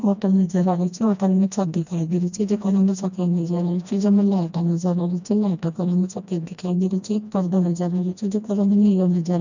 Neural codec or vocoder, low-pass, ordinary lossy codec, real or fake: codec, 16 kHz, 1 kbps, FreqCodec, smaller model; 7.2 kHz; none; fake